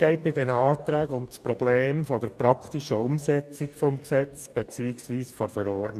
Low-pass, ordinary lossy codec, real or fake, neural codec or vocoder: 14.4 kHz; none; fake; codec, 44.1 kHz, 2.6 kbps, DAC